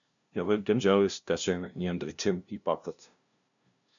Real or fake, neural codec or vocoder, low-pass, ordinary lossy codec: fake; codec, 16 kHz, 0.5 kbps, FunCodec, trained on LibriTTS, 25 frames a second; 7.2 kHz; MP3, 96 kbps